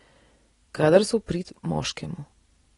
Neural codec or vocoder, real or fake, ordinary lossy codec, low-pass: none; real; AAC, 32 kbps; 10.8 kHz